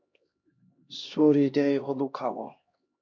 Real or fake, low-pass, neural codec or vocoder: fake; 7.2 kHz; codec, 16 kHz, 1 kbps, X-Codec, HuBERT features, trained on LibriSpeech